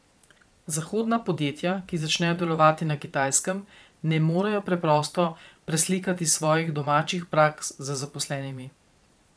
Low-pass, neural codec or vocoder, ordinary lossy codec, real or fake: none; vocoder, 22.05 kHz, 80 mel bands, Vocos; none; fake